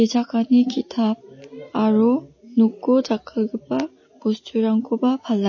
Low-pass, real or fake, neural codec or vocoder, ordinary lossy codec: 7.2 kHz; real; none; MP3, 32 kbps